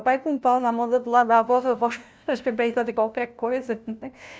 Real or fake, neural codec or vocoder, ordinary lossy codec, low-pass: fake; codec, 16 kHz, 0.5 kbps, FunCodec, trained on LibriTTS, 25 frames a second; none; none